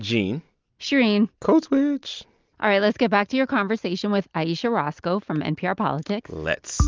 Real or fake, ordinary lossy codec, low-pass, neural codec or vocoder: real; Opus, 24 kbps; 7.2 kHz; none